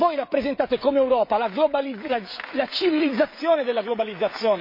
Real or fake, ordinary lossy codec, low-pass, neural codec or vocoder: fake; MP3, 32 kbps; 5.4 kHz; codec, 16 kHz, 16 kbps, FreqCodec, smaller model